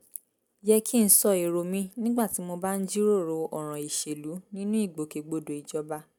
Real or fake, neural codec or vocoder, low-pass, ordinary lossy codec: real; none; none; none